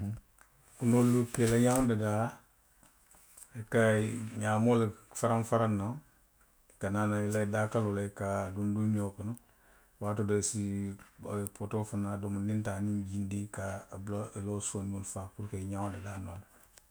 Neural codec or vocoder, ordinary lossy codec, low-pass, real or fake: autoencoder, 48 kHz, 128 numbers a frame, DAC-VAE, trained on Japanese speech; none; none; fake